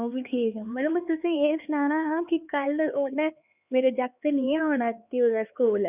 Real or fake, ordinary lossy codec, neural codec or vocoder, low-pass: fake; none; codec, 16 kHz, 4 kbps, X-Codec, HuBERT features, trained on LibriSpeech; 3.6 kHz